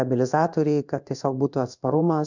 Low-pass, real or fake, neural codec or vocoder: 7.2 kHz; fake; codec, 16 kHz in and 24 kHz out, 1 kbps, XY-Tokenizer